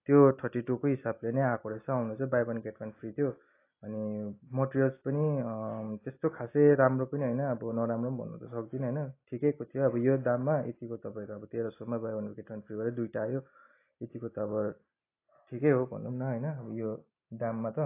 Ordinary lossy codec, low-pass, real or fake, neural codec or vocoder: AAC, 24 kbps; 3.6 kHz; real; none